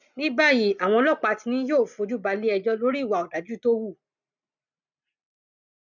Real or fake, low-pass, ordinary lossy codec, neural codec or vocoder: real; 7.2 kHz; none; none